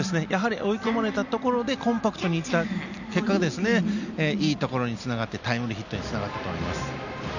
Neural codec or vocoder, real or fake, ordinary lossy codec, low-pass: none; real; none; 7.2 kHz